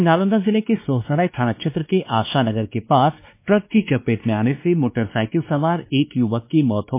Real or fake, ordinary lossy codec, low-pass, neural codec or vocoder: fake; MP3, 24 kbps; 3.6 kHz; codec, 16 kHz, 2 kbps, X-Codec, WavLM features, trained on Multilingual LibriSpeech